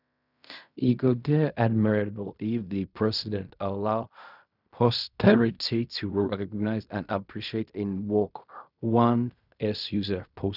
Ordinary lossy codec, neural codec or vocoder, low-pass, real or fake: none; codec, 16 kHz in and 24 kHz out, 0.4 kbps, LongCat-Audio-Codec, fine tuned four codebook decoder; 5.4 kHz; fake